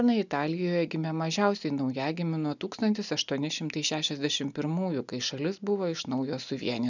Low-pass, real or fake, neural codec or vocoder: 7.2 kHz; real; none